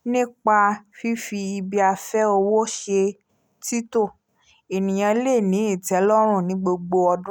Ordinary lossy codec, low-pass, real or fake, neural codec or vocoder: none; none; real; none